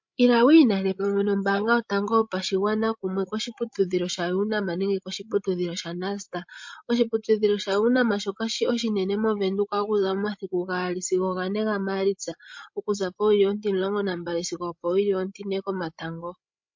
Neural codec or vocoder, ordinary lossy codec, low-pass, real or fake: codec, 16 kHz, 16 kbps, FreqCodec, larger model; MP3, 48 kbps; 7.2 kHz; fake